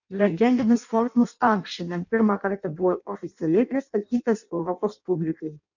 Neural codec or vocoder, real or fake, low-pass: codec, 16 kHz in and 24 kHz out, 0.6 kbps, FireRedTTS-2 codec; fake; 7.2 kHz